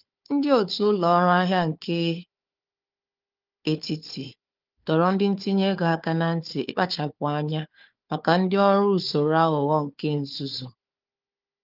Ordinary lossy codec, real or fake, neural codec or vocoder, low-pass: Opus, 32 kbps; fake; codec, 16 kHz, 4 kbps, FunCodec, trained on Chinese and English, 50 frames a second; 5.4 kHz